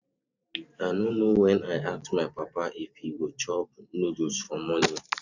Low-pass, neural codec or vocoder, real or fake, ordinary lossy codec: 7.2 kHz; vocoder, 44.1 kHz, 128 mel bands every 512 samples, BigVGAN v2; fake; none